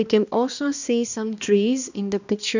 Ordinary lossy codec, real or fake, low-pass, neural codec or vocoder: none; fake; 7.2 kHz; codec, 16 kHz, 1 kbps, X-Codec, HuBERT features, trained on balanced general audio